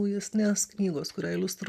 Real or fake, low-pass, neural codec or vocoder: real; 14.4 kHz; none